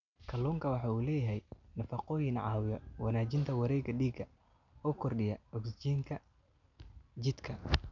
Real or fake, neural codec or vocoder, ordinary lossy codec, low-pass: real; none; none; 7.2 kHz